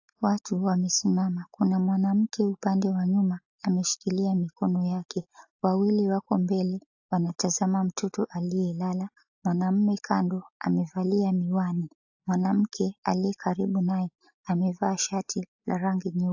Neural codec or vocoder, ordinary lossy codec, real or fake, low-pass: none; AAC, 48 kbps; real; 7.2 kHz